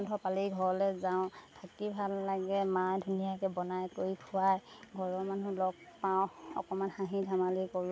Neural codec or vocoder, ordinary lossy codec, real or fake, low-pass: none; none; real; none